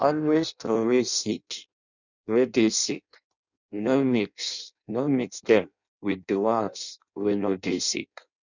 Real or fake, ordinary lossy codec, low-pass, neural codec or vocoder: fake; none; 7.2 kHz; codec, 16 kHz in and 24 kHz out, 0.6 kbps, FireRedTTS-2 codec